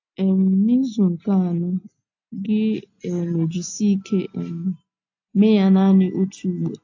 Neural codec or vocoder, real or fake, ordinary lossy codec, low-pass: none; real; AAC, 48 kbps; 7.2 kHz